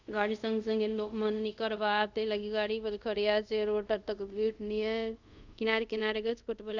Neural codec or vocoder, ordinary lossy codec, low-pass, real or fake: codec, 24 kHz, 0.5 kbps, DualCodec; none; 7.2 kHz; fake